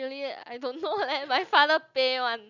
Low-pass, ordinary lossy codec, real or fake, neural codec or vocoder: 7.2 kHz; none; real; none